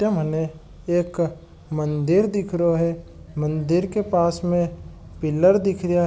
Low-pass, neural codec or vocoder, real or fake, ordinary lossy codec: none; none; real; none